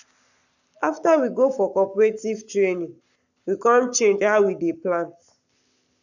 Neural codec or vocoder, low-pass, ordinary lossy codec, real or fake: codec, 44.1 kHz, 7.8 kbps, Pupu-Codec; 7.2 kHz; none; fake